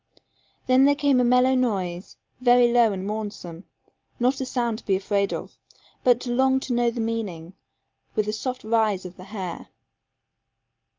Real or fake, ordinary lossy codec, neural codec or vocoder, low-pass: real; Opus, 32 kbps; none; 7.2 kHz